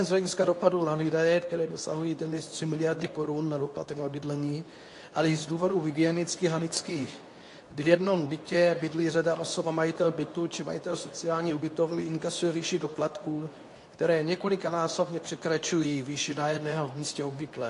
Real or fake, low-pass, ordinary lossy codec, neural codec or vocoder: fake; 10.8 kHz; AAC, 48 kbps; codec, 24 kHz, 0.9 kbps, WavTokenizer, medium speech release version 2